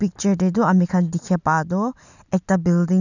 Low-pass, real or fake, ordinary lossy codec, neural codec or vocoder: 7.2 kHz; real; none; none